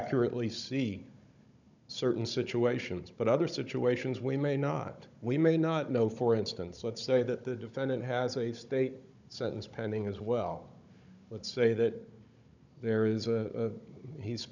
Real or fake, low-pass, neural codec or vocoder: fake; 7.2 kHz; codec, 16 kHz, 16 kbps, FunCodec, trained on Chinese and English, 50 frames a second